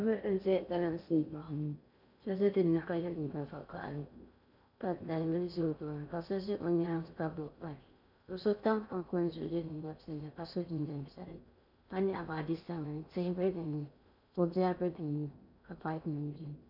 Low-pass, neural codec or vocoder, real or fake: 5.4 kHz; codec, 16 kHz in and 24 kHz out, 0.6 kbps, FocalCodec, streaming, 4096 codes; fake